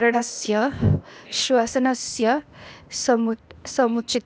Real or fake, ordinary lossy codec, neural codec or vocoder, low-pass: fake; none; codec, 16 kHz, 0.8 kbps, ZipCodec; none